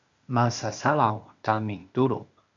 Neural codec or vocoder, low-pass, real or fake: codec, 16 kHz, 0.8 kbps, ZipCodec; 7.2 kHz; fake